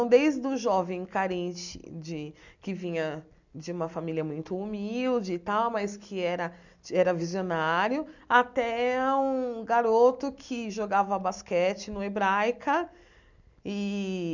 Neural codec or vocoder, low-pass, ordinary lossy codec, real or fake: none; 7.2 kHz; none; real